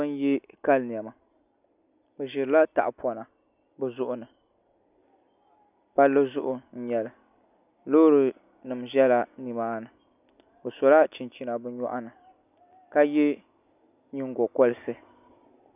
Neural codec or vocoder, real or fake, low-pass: none; real; 3.6 kHz